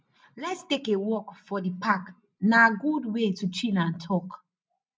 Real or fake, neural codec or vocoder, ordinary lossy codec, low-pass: real; none; none; none